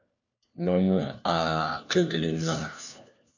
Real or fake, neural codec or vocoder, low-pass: fake; codec, 16 kHz, 1 kbps, FunCodec, trained on LibriTTS, 50 frames a second; 7.2 kHz